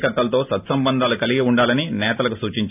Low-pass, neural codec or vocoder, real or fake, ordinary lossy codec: 3.6 kHz; none; real; AAC, 32 kbps